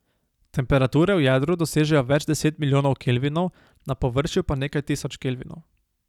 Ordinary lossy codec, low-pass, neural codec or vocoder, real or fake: none; 19.8 kHz; none; real